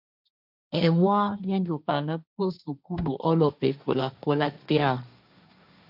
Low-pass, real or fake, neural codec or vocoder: 5.4 kHz; fake; codec, 16 kHz, 1.1 kbps, Voila-Tokenizer